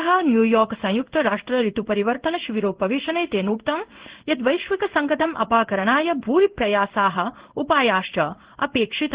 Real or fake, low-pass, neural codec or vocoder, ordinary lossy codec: fake; 3.6 kHz; codec, 16 kHz in and 24 kHz out, 1 kbps, XY-Tokenizer; Opus, 16 kbps